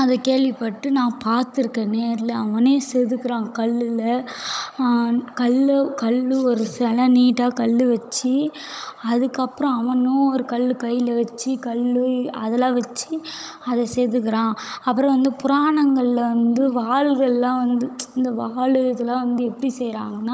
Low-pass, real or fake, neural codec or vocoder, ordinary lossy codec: none; fake; codec, 16 kHz, 16 kbps, FunCodec, trained on Chinese and English, 50 frames a second; none